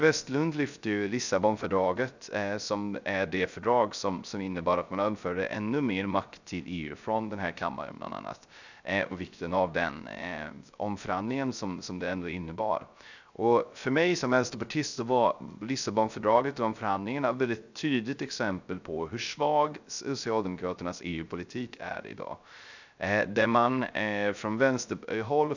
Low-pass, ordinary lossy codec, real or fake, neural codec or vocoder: 7.2 kHz; none; fake; codec, 16 kHz, 0.3 kbps, FocalCodec